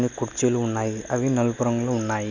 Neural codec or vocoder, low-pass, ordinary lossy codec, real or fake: none; 7.2 kHz; none; real